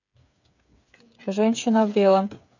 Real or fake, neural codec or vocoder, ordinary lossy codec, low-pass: fake; codec, 16 kHz, 8 kbps, FreqCodec, smaller model; none; 7.2 kHz